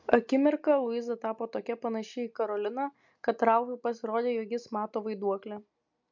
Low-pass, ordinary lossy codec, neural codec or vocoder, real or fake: 7.2 kHz; MP3, 64 kbps; none; real